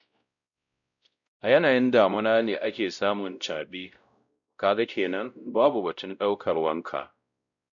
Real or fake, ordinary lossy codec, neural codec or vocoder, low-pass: fake; none; codec, 16 kHz, 0.5 kbps, X-Codec, WavLM features, trained on Multilingual LibriSpeech; 7.2 kHz